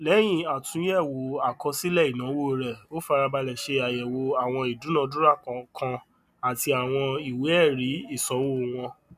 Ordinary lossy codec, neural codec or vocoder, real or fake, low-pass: none; none; real; 14.4 kHz